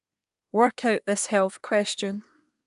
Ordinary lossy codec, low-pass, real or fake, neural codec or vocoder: AAC, 96 kbps; 10.8 kHz; fake; codec, 24 kHz, 1 kbps, SNAC